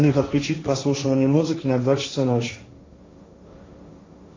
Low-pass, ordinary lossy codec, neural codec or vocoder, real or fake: 7.2 kHz; AAC, 32 kbps; codec, 16 kHz, 1.1 kbps, Voila-Tokenizer; fake